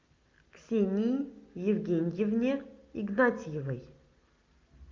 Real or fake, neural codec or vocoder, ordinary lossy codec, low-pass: real; none; Opus, 24 kbps; 7.2 kHz